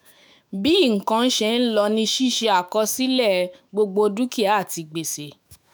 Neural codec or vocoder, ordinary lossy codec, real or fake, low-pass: autoencoder, 48 kHz, 128 numbers a frame, DAC-VAE, trained on Japanese speech; none; fake; none